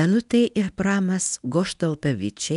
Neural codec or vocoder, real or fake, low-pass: codec, 24 kHz, 0.9 kbps, WavTokenizer, medium speech release version 1; fake; 10.8 kHz